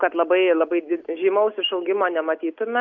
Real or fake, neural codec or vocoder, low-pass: real; none; 7.2 kHz